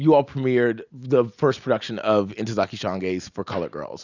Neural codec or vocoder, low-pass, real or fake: none; 7.2 kHz; real